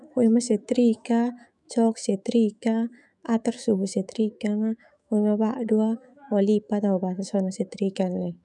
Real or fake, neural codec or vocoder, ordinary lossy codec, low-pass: fake; autoencoder, 48 kHz, 128 numbers a frame, DAC-VAE, trained on Japanese speech; none; 10.8 kHz